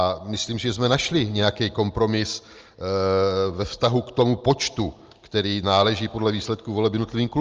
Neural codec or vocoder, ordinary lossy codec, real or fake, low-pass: none; Opus, 24 kbps; real; 7.2 kHz